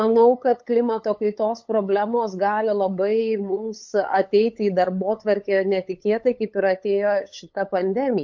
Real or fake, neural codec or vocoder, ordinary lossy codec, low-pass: fake; codec, 16 kHz, 8 kbps, FunCodec, trained on LibriTTS, 25 frames a second; MP3, 48 kbps; 7.2 kHz